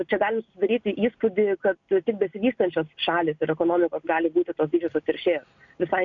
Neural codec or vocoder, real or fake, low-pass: none; real; 7.2 kHz